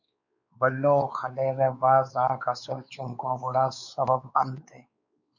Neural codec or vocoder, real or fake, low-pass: codec, 16 kHz, 4 kbps, X-Codec, WavLM features, trained on Multilingual LibriSpeech; fake; 7.2 kHz